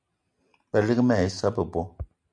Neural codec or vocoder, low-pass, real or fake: none; 9.9 kHz; real